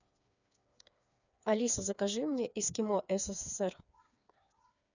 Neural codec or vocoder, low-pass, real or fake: codec, 16 kHz, 4 kbps, FreqCodec, smaller model; 7.2 kHz; fake